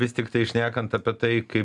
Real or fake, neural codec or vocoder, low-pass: real; none; 10.8 kHz